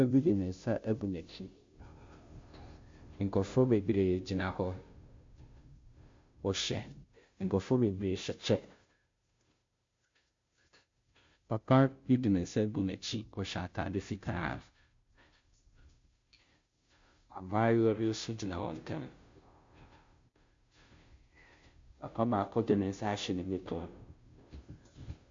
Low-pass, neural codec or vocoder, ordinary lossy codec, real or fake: 7.2 kHz; codec, 16 kHz, 0.5 kbps, FunCodec, trained on Chinese and English, 25 frames a second; MP3, 48 kbps; fake